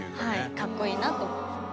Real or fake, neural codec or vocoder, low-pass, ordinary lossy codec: real; none; none; none